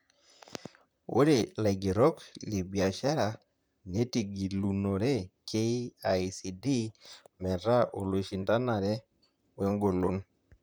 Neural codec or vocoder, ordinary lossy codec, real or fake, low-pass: vocoder, 44.1 kHz, 128 mel bands, Pupu-Vocoder; none; fake; none